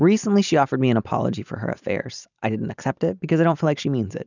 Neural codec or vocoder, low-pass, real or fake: none; 7.2 kHz; real